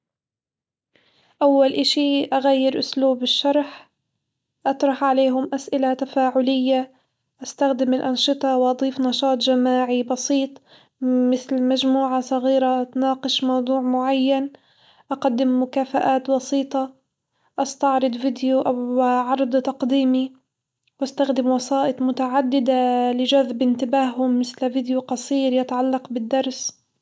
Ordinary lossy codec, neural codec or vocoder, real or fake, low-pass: none; none; real; none